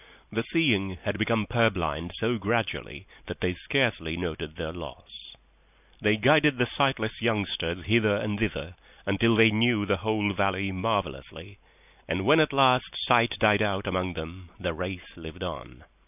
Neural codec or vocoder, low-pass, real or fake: none; 3.6 kHz; real